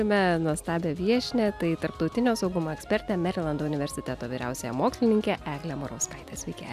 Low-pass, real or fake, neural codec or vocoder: 14.4 kHz; real; none